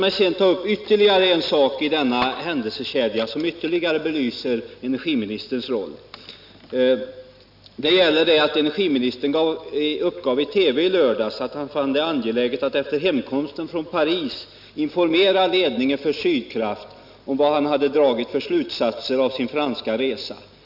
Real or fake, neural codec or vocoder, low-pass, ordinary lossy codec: real; none; 5.4 kHz; none